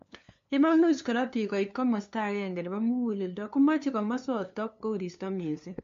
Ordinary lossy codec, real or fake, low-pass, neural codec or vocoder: MP3, 48 kbps; fake; 7.2 kHz; codec, 16 kHz, 2 kbps, FunCodec, trained on LibriTTS, 25 frames a second